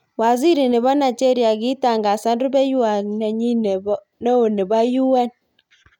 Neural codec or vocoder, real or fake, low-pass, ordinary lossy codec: none; real; 19.8 kHz; none